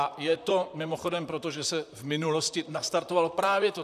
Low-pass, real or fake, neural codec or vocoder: 14.4 kHz; fake; vocoder, 44.1 kHz, 128 mel bands, Pupu-Vocoder